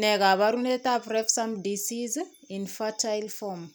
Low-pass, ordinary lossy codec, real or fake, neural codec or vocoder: none; none; real; none